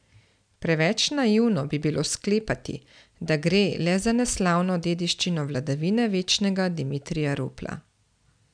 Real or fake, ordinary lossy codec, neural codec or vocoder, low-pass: real; none; none; 9.9 kHz